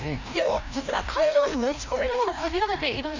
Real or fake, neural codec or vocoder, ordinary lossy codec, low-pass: fake; codec, 16 kHz, 1 kbps, FreqCodec, larger model; none; 7.2 kHz